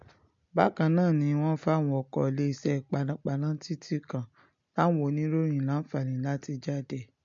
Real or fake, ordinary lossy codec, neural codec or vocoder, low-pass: real; MP3, 48 kbps; none; 7.2 kHz